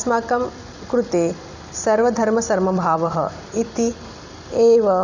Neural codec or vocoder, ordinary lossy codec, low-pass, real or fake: vocoder, 44.1 kHz, 128 mel bands every 256 samples, BigVGAN v2; none; 7.2 kHz; fake